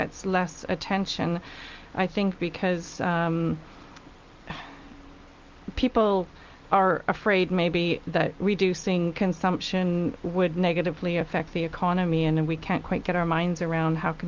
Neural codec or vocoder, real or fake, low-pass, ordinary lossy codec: none; real; 7.2 kHz; Opus, 24 kbps